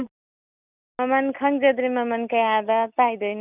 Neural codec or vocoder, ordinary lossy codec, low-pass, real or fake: none; none; 3.6 kHz; real